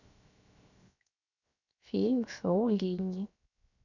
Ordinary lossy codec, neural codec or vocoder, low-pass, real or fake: none; codec, 16 kHz, 0.7 kbps, FocalCodec; 7.2 kHz; fake